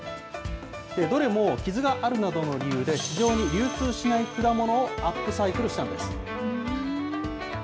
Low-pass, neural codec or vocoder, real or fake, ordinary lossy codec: none; none; real; none